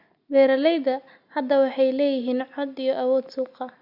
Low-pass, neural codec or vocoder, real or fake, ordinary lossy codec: 5.4 kHz; none; real; Opus, 64 kbps